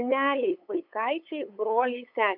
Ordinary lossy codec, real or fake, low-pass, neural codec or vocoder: MP3, 48 kbps; fake; 5.4 kHz; codec, 16 kHz, 8 kbps, FunCodec, trained on LibriTTS, 25 frames a second